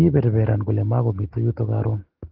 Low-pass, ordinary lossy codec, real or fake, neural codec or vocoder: 5.4 kHz; Opus, 16 kbps; real; none